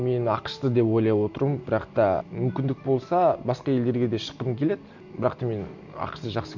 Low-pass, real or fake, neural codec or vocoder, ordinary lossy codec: 7.2 kHz; real; none; none